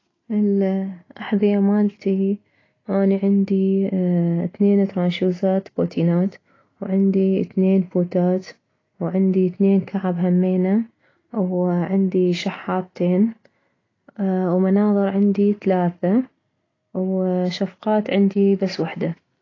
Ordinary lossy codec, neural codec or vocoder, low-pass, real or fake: AAC, 32 kbps; none; 7.2 kHz; real